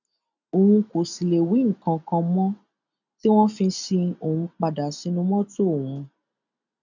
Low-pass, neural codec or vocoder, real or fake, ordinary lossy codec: 7.2 kHz; none; real; none